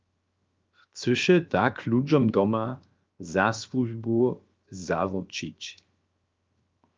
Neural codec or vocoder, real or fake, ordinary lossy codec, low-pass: codec, 16 kHz, 0.7 kbps, FocalCodec; fake; Opus, 24 kbps; 7.2 kHz